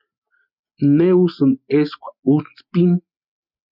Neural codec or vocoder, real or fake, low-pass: none; real; 5.4 kHz